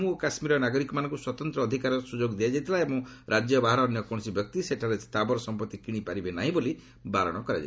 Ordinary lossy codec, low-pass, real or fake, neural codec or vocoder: none; none; real; none